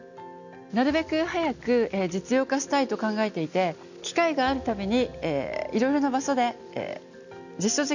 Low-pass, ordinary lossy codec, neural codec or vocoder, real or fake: 7.2 kHz; none; none; real